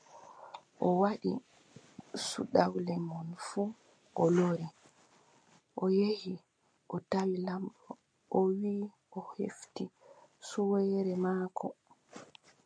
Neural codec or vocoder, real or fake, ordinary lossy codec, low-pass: none; real; MP3, 96 kbps; 9.9 kHz